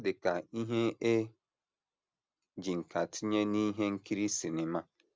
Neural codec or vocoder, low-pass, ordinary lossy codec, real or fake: none; none; none; real